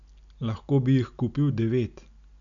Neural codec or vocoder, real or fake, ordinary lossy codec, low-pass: none; real; none; 7.2 kHz